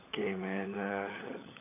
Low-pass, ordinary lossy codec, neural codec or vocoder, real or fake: 3.6 kHz; AAC, 24 kbps; codec, 16 kHz, 16 kbps, FunCodec, trained on LibriTTS, 50 frames a second; fake